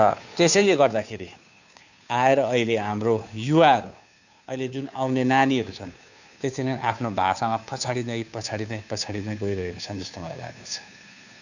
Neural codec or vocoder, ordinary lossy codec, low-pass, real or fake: codec, 16 kHz, 2 kbps, FunCodec, trained on Chinese and English, 25 frames a second; none; 7.2 kHz; fake